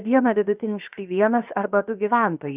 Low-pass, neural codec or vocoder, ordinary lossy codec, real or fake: 3.6 kHz; codec, 16 kHz, 0.7 kbps, FocalCodec; Opus, 64 kbps; fake